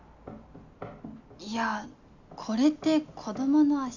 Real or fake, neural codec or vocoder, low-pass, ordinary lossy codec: real; none; 7.2 kHz; none